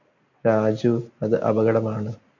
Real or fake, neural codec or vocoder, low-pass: real; none; 7.2 kHz